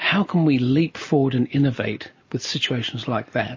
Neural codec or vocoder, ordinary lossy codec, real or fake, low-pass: none; MP3, 32 kbps; real; 7.2 kHz